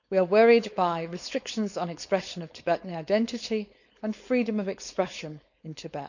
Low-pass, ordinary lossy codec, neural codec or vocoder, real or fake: 7.2 kHz; none; codec, 16 kHz, 4.8 kbps, FACodec; fake